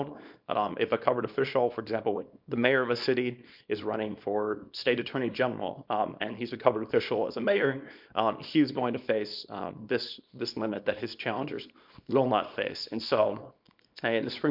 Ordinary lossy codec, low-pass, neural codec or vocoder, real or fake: MP3, 48 kbps; 5.4 kHz; codec, 24 kHz, 0.9 kbps, WavTokenizer, small release; fake